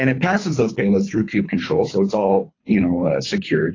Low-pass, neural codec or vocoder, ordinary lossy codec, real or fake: 7.2 kHz; codec, 24 kHz, 3 kbps, HILCodec; AAC, 32 kbps; fake